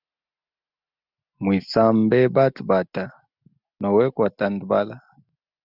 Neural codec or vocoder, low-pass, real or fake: none; 5.4 kHz; real